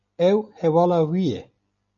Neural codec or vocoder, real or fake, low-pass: none; real; 7.2 kHz